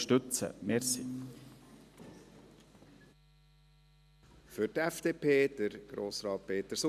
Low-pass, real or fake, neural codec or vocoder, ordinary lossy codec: 14.4 kHz; real; none; none